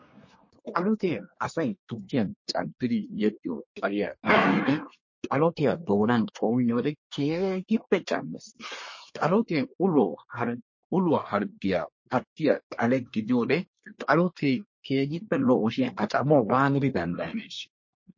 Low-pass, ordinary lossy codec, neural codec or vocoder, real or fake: 7.2 kHz; MP3, 32 kbps; codec, 24 kHz, 1 kbps, SNAC; fake